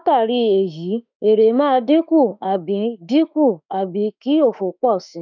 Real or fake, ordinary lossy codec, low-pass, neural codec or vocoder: fake; none; 7.2 kHz; autoencoder, 48 kHz, 32 numbers a frame, DAC-VAE, trained on Japanese speech